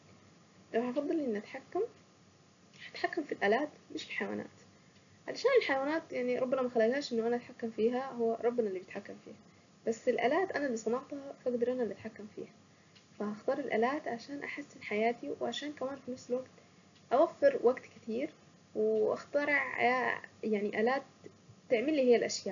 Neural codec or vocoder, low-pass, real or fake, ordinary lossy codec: none; 7.2 kHz; real; none